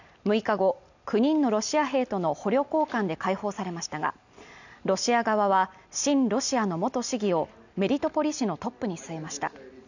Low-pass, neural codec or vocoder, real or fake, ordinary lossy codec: 7.2 kHz; none; real; none